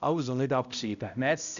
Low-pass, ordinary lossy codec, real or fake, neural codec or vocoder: 7.2 kHz; none; fake; codec, 16 kHz, 0.5 kbps, X-Codec, HuBERT features, trained on balanced general audio